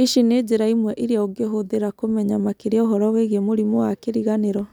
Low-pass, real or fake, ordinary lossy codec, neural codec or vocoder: 19.8 kHz; real; none; none